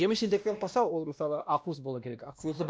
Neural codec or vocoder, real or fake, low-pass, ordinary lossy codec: codec, 16 kHz, 1 kbps, X-Codec, WavLM features, trained on Multilingual LibriSpeech; fake; none; none